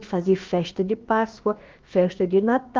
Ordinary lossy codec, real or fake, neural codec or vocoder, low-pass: Opus, 32 kbps; fake; codec, 16 kHz, about 1 kbps, DyCAST, with the encoder's durations; 7.2 kHz